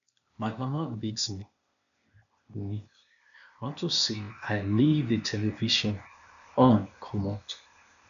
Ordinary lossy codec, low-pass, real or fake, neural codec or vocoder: none; 7.2 kHz; fake; codec, 16 kHz, 0.8 kbps, ZipCodec